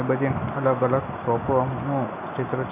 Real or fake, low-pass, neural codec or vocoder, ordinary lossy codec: real; 3.6 kHz; none; none